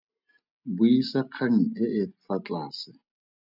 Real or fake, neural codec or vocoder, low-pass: real; none; 5.4 kHz